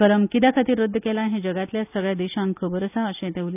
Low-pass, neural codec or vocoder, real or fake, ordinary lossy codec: 3.6 kHz; none; real; none